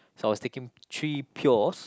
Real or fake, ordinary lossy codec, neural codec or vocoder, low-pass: real; none; none; none